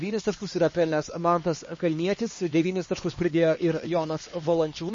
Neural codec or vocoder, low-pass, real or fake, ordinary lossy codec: codec, 16 kHz, 2 kbps, X-Codec, HuBERT features, trained on LibriSpeech; 7.2 kHz; fake; MP3, 32 kbps